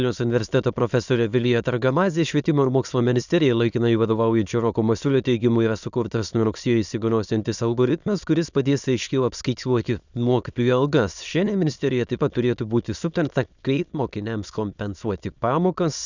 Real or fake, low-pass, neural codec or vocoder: fake; 7.2 kHz; autoencoder, 22.05 kHz, a latent of 192 numbers a frame, VITS, trained on many speakers